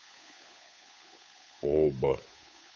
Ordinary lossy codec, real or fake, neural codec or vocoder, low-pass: none; fake; codec, 16 kHz, 8 kbps, FunCodec, trained on Chinese and English, 25 frames a second; none